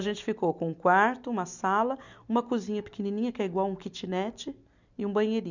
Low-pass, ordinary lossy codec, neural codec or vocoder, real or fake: 7.2 kHz; none; none; real